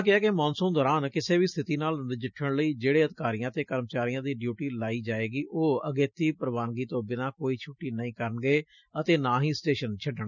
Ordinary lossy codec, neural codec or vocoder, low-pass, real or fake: none; none; 7.2 kHz; real